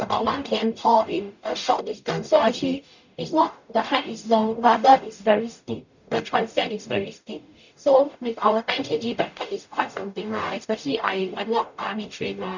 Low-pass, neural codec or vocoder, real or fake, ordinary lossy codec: 7.2 kHz; codec, 44.1 kHz, 0.9 kbps, DAC; fake; none